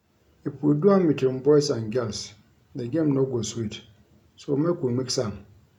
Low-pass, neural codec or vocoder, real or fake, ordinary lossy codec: 19.8 kHz; none; real; none